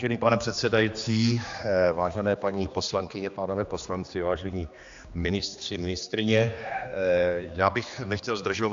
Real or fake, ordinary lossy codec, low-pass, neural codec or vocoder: fake; AAC, 64 kbps; 7.2 kHz; codec, 16 kHz, 2 kbps, X-Codec, HuBERT features, trained on general audio